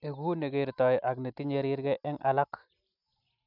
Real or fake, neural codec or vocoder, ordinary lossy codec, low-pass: real; none; none; 5.4 kHz